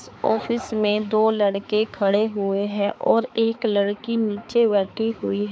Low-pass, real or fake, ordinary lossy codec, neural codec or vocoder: none; fake; none; codec, 16 kHz, 4 kbps, X-Codec, HuBERT features, trained on balanced general audio